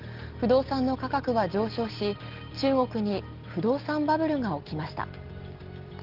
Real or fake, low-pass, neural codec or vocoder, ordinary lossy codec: real; 5.4 kHz; none; Opus, 16 kbps